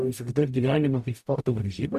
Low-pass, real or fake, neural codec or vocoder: 14.4 kHz; fake; codec, 44.1 kHz, 0.9 kbps, DAC